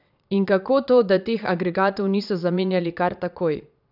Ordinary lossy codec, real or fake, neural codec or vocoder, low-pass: none; fake; vocoder, 22.05 kHz, 80 mel bands, Vocos; 5.4 kHz